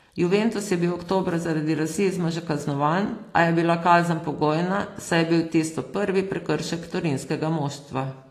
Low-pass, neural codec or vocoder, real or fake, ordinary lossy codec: 14.4 kHz; none; real; AAC, 48 kbps